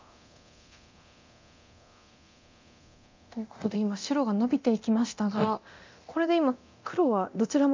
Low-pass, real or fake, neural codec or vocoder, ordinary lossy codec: 7.2 kHz; fake; codec, 24 kHz, 0.9 kbps, DualCodec; MP3, 64 kbps